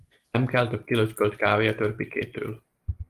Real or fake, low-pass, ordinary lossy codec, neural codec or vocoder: real; 14.4 kHz; Opus, 24 kbps; none